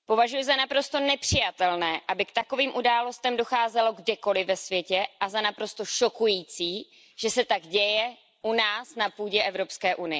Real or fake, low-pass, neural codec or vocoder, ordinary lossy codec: real; none; none; none